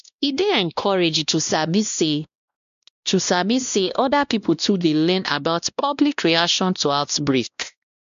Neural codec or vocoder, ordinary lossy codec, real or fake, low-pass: codec, 16 kHz, 1 kbps, X-Codec, WavLM features, trained on Multilingual LibriSpeech; MP3, 64 kbps; fake; 7.2 kHz